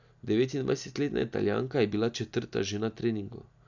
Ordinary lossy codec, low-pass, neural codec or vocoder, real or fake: none; 7.2 kHz; none; real